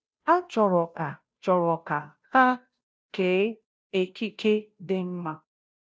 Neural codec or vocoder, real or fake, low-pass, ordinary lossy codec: codec, 16 kHz, 0.5 kbps, FunCodec, trained on Chinese and English, 25 frames a second; fake; none; none